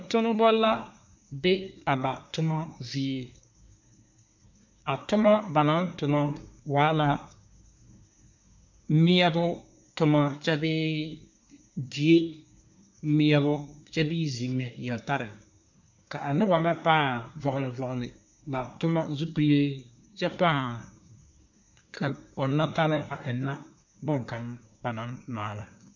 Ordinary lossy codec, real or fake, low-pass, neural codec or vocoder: MP3, 64 kbps; fake; 7.2 kHz; codec, 24 kHz, 1 kbps, SNAC